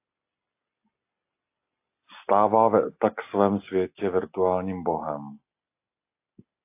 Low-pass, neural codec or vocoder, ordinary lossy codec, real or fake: 3.6 kHz; none; AAC, 24 kbps; real